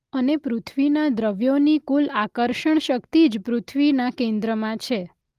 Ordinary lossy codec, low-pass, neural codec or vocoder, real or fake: Opus, 32 kbps; 14.4 kHz; none; real